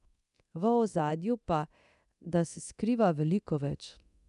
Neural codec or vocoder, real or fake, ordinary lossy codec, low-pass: codec, 24 kHz, 0.9 kbps, DualCodec; fake; none; 10.8 kHz